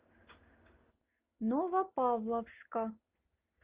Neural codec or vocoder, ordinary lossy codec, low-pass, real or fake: none; Opus, 32 kbps; 3.6 kHz; real